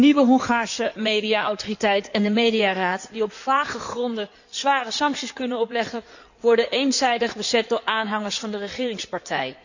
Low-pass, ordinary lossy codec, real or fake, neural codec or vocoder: 7.2 kHz; none; fake; codec, 16 kHz in and 24 kHz out, 2.2 kbps, FireRedTTS-2 codec